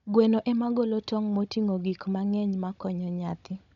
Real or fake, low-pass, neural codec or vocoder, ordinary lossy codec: fake; 7.2 kHz; codec, 16 kHz, 16 kbps, FunCodec, trained on Chinese and English, 50 frames a second; none